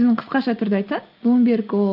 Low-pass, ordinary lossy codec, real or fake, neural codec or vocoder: 5.4 kHz; Opus, 32 kbps; real; none